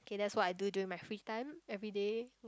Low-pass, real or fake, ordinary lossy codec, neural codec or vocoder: none; real; none; none